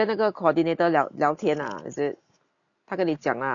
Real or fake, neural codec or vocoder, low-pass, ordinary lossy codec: real; none; 7.2 kHz; none